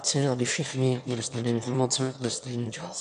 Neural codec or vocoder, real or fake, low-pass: autoencoder, 22.05 kHz, a latent of 192 numbers a frame, VITS, trained on one speaker; fake; 9.9 kHz